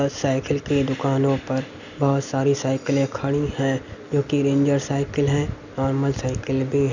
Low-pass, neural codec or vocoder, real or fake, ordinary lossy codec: 7.2 kHz; none; real; none